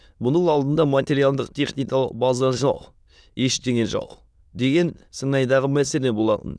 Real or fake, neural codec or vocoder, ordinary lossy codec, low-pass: fake; autoencoder, 22.05 kHz, a latent of 192 numbers a frame, VITS, trained on many speakers; none; none